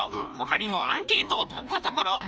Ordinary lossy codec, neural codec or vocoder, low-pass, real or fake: none; codec, 16 kHz, 1 kbps, FreqCodec, larger model; none; fake